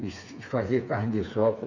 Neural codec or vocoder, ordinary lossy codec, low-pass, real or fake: codec, 16 kHz, 4 kbps, FreqCodec, smaller model; none; 7.2 kHz; fake